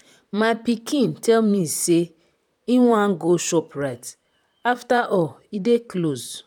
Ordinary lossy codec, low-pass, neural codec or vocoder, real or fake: none; 19.8 kHz; vocoder, 44.1 kHz, 128 mel bands, Pupu-Vocoder; fake